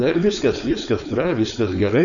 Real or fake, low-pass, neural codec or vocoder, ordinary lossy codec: fake; 7.2 kHz; codec, 16 kHz, 4.8 kbps, FACodec; MP3, 96 kbps